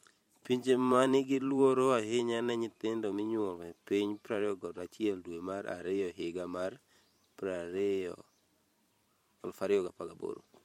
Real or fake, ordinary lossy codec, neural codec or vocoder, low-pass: fake; MP3, 64 kbps; vocoder, 44.1 kHz, 128 mel bands every 512 samples, BigVGAN v2; 19.8 kHz